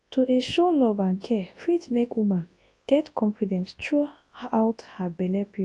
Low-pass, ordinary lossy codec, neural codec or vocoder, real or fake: 10.8 kHz; AAC, 48 kbps; codec, 24 kHz, 0.9 kbps, WavTokenizer, large speech release; fake